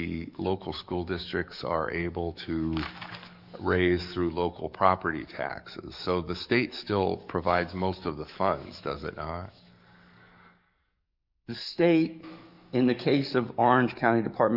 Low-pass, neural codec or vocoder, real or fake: 5.4 kHz; codec, 44.1 kHz, 7.8 kbps, DAC; fake